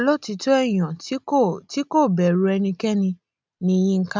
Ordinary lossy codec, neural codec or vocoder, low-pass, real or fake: none; none; none; real